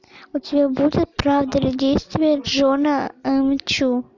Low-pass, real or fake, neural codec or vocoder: 7.2 kHz; real; none